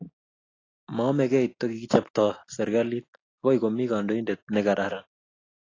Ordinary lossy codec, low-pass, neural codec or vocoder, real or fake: AAC, 32 kbps; 7.2 kHz; none; real